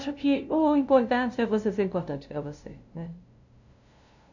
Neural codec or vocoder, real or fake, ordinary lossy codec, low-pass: codec, 16 kHz, 0.5 kbps, FunCodec, trained on LibriTTS, 25 frames a second; fake; none; 7.2 kHz